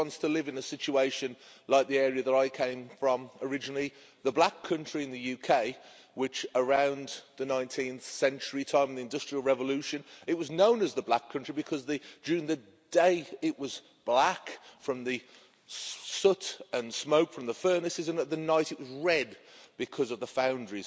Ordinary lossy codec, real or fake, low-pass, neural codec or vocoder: none; real; none; none